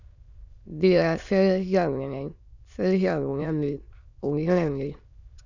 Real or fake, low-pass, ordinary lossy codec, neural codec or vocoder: fake; 7.2 kHz; none; autoencoder, 22.05 kHz, a latent of 192 numbers a frame, VITS, trained on many speakers